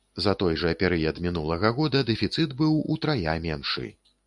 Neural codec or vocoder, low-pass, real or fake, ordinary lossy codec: none; 10.8 kHz; real; MP3, 96 kbps